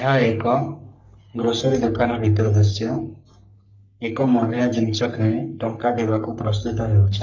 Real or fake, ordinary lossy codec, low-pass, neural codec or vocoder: fake; none; 7.2 kHz; codec, 44.1 kHz, 3.4 kbps, Pupu-Codec